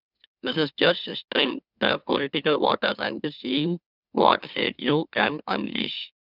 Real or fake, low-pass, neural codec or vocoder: fake; 5.4 kHz; autoencoder, 44.1 kHz, a latent of 192 numbers a frame, MeloTTS